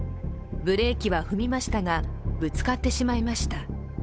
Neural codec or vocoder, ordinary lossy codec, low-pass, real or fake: codec, 16 kHz, 8 kbps, FunCodec, trained on Chinese and English, 25 frames a second; none; none; fake